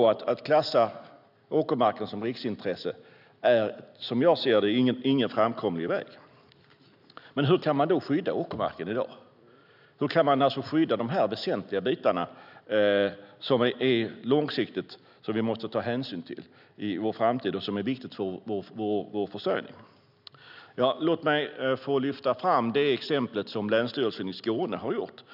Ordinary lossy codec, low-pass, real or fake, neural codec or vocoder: none; 5.4 kHz; real; none